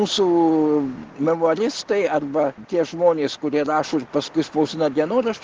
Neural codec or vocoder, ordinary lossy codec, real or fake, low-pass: none; Opus, 16 kbps; real; 7.2 kHz